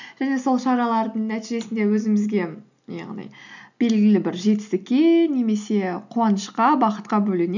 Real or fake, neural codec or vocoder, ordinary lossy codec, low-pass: real; none; none; 7.2 kHz